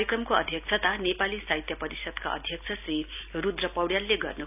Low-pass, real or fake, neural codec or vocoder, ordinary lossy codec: 3.6 kHz; real; none; none